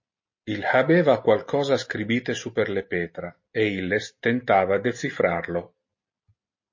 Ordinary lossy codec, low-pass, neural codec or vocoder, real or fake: MP3, 32 kbps; 7.2 kHz; none; real